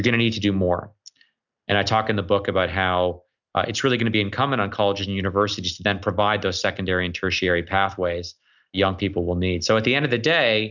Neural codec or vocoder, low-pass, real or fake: none; 7.2 kHz; real